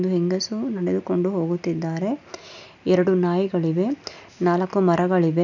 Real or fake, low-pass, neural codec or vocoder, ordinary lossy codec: real; 7.2 kHz; none; none